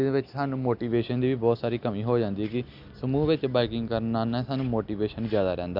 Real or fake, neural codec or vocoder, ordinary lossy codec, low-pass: real; none; none; 5.4 kHz